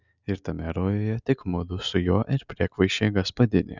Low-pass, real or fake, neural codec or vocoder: 7.2 kHz; real; none